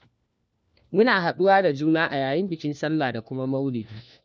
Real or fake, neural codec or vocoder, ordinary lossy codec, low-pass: fake; codec, 16 kHz, 1 kbps, FunCodec, trained on LibriTTS, 50 frames a second; none; none